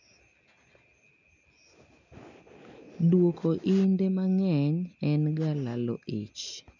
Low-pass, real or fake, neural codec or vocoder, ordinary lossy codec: 7.2 kHz; real; none; none